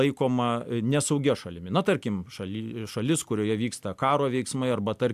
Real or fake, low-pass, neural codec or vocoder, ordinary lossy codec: real; 14.4 kHz; none; AAC, 96 kbps